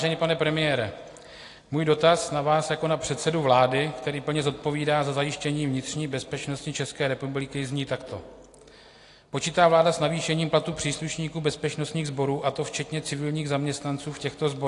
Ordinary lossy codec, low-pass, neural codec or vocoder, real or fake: AAC, 48 kbps; 10.8 kHz; none; real